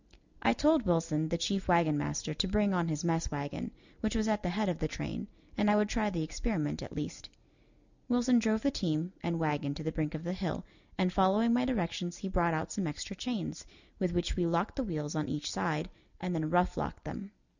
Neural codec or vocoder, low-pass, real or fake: none; 7.2 kHz; real